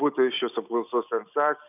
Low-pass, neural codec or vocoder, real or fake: 3.6 kHz; none; real